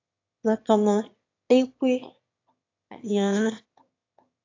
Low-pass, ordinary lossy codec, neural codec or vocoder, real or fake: 7.2 kHz; AAC, 48 kbps; autoencoder, 22.05 kHz, a latent of 192 numbers a frame, VITS, trained on one speaker; fake